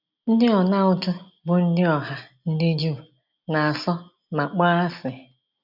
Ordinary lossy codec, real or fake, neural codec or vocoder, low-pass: none; real; none; 5.4 kHz